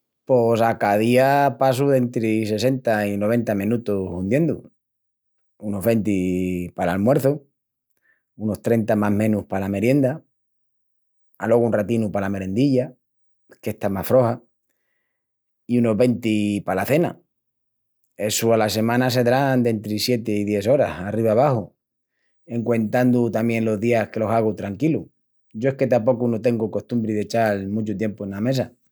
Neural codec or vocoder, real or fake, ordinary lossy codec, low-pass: none; real; none; none